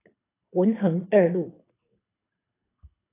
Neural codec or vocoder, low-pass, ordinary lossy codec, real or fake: codec, 24 kHz, 6 kbps, HILCodec; 3.6 kHz; AAC, 16 kbps; fake